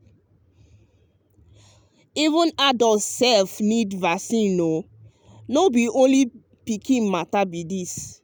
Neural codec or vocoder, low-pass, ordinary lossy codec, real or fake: none; none; none; real